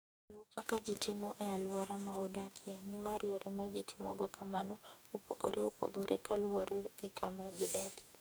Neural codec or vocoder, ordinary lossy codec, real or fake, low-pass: codec, 44.1 kHz, 2.6 kbps, DAC; none; fake; none